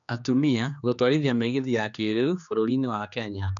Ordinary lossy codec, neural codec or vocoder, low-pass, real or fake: none; codec, 16 kHz, 2 kbps, X-Codec, HuBERT features, trained on general audio; 7.2 kHz; fake